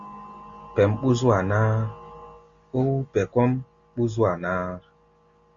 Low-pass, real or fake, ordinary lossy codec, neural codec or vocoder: 7.2 kHz; real; Opus, 64 kbps; none